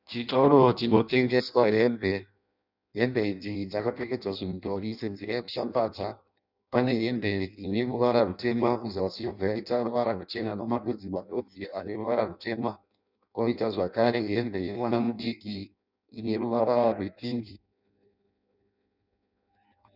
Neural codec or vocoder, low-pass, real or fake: codec, 16 kHz in and 24 kHz out, 0.6 kbps, FireRedTTS-2 codec; 5.4 kHz; fake